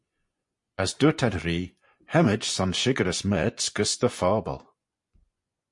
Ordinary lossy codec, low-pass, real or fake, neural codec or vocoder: MP3, 48 kbps; 10.8 kHz; fake; vocoder, 24 kHz, 100 mel bands, Vocos